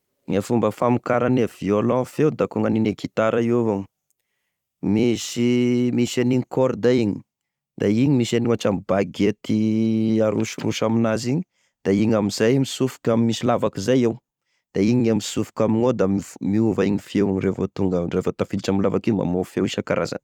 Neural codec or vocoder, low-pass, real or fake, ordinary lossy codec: vocoder, 44.1 kHz, 128 mel bands every 256 samples, BigVGAN v2; 19.8 kHz; fake; none